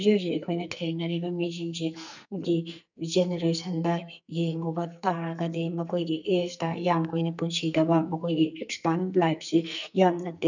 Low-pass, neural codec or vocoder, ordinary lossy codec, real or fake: 7.2 kHz; codec, 44.1 kHz, 2.6 kbps, SNAC; none; fake